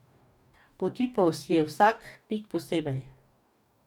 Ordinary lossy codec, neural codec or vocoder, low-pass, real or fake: none; codec, 44.1 kHz, 2.6 kbps, DAC; 19.8 kHz; fake